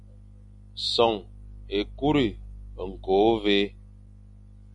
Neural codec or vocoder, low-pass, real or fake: none; 10.8 kHz; real